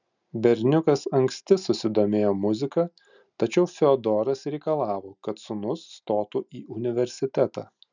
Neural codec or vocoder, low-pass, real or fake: none; 7.2 kHz; real